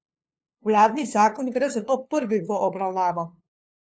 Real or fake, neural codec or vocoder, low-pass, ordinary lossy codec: fake; codec, 16 kHz, 2 kbps, FunCodec, trained on LibriTTS, 25 frames a second; none; none